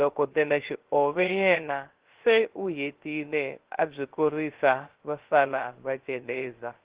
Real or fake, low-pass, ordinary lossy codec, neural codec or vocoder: fake; 3.6 kHz; Opus, 16 kbps; codec, 16 kHz, 0.3 kbps, FocalCodec